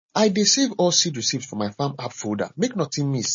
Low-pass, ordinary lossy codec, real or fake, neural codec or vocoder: 7.2 kHz; MP3, 32 kbps; real; none